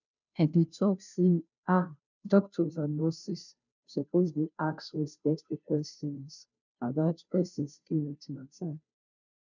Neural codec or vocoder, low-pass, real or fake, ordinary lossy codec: codec, 16 kHz, 0.5 kbps, FunCodec, trained on Chinese and English, 25 frames a second; 7.2 kHz; fake; none